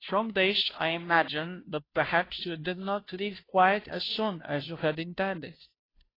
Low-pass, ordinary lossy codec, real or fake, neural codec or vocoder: 5.4 kHz; AAC, 24 kbps; fake; codec, 16 kHz, 0.5 kbps, FunCodec, trained on Chinese and English, 25 frames a second